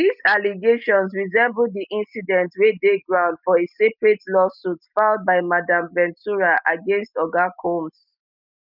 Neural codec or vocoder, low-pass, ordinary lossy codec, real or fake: none; 5.4 kHz; none; real